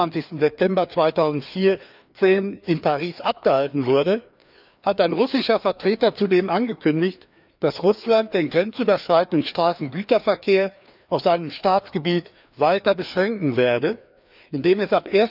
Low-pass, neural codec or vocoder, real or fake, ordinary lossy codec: 5.4 kHz; codec, 16 kHz, 2 kbps, FreqCodec, larger model; fake; none